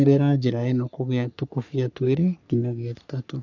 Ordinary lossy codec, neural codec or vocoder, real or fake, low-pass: none; codec, 44.1 kHz, 3.4 kbps, Pupu-Codec; fake; 7.2 kHz